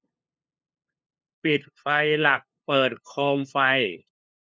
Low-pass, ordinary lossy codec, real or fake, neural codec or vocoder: none; none; fake; codec, 16 kHz, 2 kbps, FunCodec, trained on LibriTTS, 25 frames a second